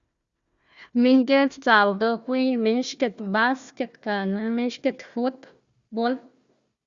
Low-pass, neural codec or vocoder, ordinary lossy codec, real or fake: 7.2 kHz; codec, 16 kHz, 1 kbps, FunCodec, trained on Chinese and English, 50 frames a second; Opus, 64 kbps; fake